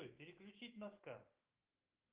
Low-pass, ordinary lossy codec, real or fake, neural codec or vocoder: 3.6 kHz; Opus, 32 kbps; fake; autoencoder, 48 kHz, 128 numbers a frame, DAC-VAE, trained on Japanese speech